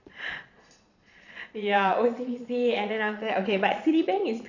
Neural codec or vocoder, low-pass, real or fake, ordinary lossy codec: vocoder, 22.05 kHz, 80 mel bands, Vocos; 7.2 kHz; fake; none